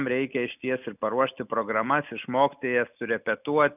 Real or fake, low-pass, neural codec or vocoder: real; 3.6 kHz; none